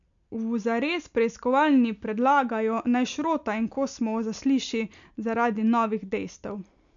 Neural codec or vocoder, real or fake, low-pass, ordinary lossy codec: none; real; 7.2 kHz; none